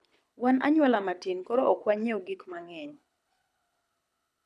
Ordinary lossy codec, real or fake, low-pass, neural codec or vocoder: none; fake; none; codec, 24 kHz, 6 kbps, HILCodec